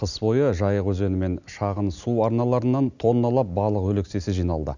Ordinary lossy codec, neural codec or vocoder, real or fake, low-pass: none; none; real; 7.2 kHz